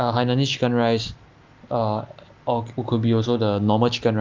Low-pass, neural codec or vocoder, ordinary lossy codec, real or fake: 7.2 kHz; none; Opus, 32 kbps; real